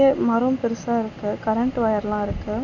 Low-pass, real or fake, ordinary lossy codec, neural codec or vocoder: 7.2 kHz; real; none; none